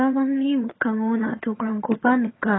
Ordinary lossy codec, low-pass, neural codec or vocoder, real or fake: AAC, 16 kbps; 7.2 kHz; vocoder, 22.05 kHz, 80 mel bands, HiFi-GAN; fake